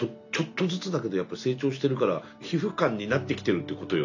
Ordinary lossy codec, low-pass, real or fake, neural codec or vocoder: none; 7.2 kHz; real; none